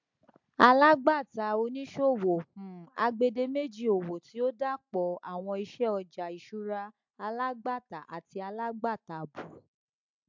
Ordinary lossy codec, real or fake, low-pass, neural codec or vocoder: MP3, 64 kbps; real; 7.2 kHz; none